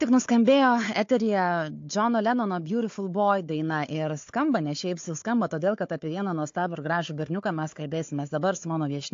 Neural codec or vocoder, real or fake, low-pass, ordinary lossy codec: codec, 16 kHz, 4 kbps, FunCodec, trained on Chinese and English, 50 frames a second; fake; 7.2 kHz; AAC, 48 kbps